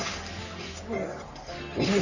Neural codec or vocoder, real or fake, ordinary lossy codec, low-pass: codec, 44.1 kHz, 3.4 kbps, Pupu-Codec; fake; none; 7.2 kHz